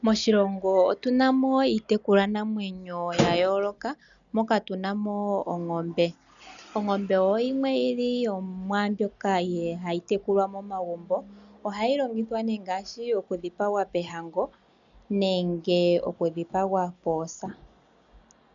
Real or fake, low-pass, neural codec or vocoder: real; 7.2 kHz; none